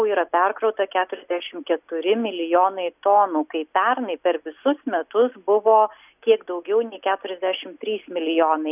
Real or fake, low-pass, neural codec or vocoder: real; 3.6 kHz; none